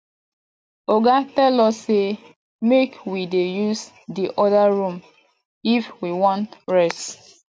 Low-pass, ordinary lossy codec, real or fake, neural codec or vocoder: none; none; real; none